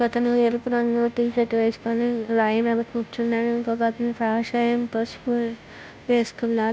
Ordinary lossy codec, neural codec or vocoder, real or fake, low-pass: none; codec, 16 kHz, 0.5 kbps, FunCodec, trained on Chinese and English, 25 frames a second; fake; none